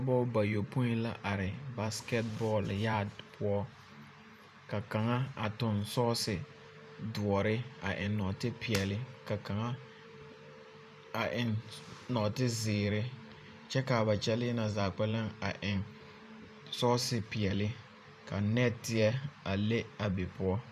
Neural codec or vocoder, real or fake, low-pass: vocoder, 44.1 kHz, 128 mel bands every 512 samples, BigVGAN v2; fake; 14.4 kHz